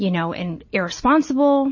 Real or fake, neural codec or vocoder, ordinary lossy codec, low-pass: real; none; MP3, 32 kbps; 7.2 kHz